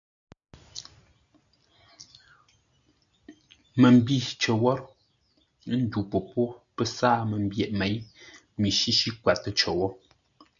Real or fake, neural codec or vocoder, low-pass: real; none; 7.2 kHz